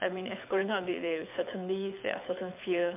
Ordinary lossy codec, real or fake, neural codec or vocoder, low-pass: MP3, 32 kbps; fake; codec, 24 kHz, 6 kbps, HILCodec; 3.6 kHz